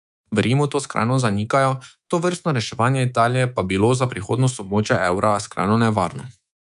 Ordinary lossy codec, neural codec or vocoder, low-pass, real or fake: none; codec, 24 kHz, 3.1 kbps, DualCodec; 10.8 kHz; fake